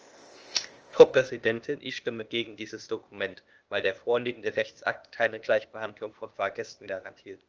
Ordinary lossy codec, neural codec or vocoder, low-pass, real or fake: Opus, 24 kbps; codec, 16 kHz, 0.8 kbps, ZipCodec; 7.2 kHz; fake